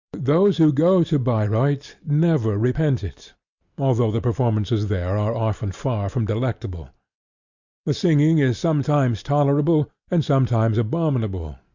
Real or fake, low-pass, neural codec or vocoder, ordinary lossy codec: real; 7.2 kHz; none; Opus, 64 kbps